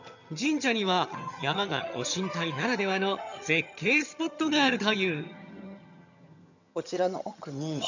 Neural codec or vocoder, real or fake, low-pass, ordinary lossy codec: vocoder, 22.05 kHz, 80 mel bands, HiFi-GAN; fake; 7.2 kHz; none